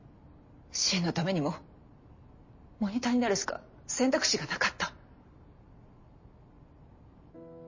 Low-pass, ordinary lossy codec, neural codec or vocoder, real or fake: 7.2 kHz; MP3, 32 kbps; none; real